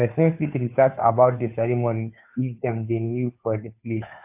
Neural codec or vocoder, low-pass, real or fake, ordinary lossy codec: codec, 16 kHz, 2 kbps, FunCodec, trained on Chinese and English, 25 frames a second; 3.6 kHz; fake; AAC, 32 kbps